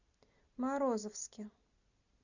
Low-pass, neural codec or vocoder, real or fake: 7.2 kHz; none; real